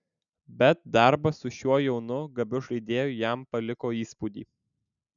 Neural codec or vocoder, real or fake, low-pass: none; real; 7.2 kHz